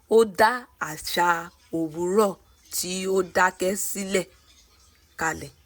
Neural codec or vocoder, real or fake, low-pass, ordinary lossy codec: none; real; none; none